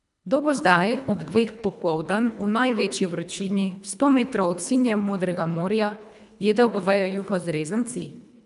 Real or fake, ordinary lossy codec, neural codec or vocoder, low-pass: fake; none; codec, 24 kHz, 1.5 kbps, HILCodec; 10.8 kHz